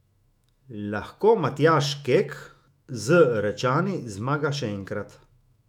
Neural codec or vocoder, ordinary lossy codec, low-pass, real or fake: autoencoder, 48 kHz, 128 numbers a frame, DAC-VAE, trained on Japanese speech; none; 19.8 kHz; fake